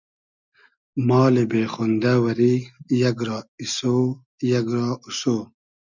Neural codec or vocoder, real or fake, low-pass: none; real; 7.2 kHz